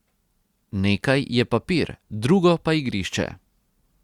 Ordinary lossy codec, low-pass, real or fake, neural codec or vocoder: Opus, 64 kbps; 19.8 kHz; real; none